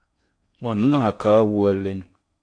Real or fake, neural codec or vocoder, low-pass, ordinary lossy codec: fake; codec, 16 kHz in and 24 kHz out, 0.6 kbps, FocalCodec, streaming, 4096 codes; 9.9 kHz; AAC, 48 kbps